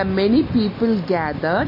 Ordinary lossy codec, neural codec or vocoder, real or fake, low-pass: MP3, 24 kbps; none; real; 5.4 kHz